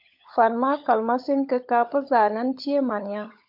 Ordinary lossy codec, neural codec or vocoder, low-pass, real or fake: MP3, 48 kbps; codec, 16 kHz, 16 kbps, FunCodec, trained on LibriTTS, 50 frames a second; 5.4 kHz; fake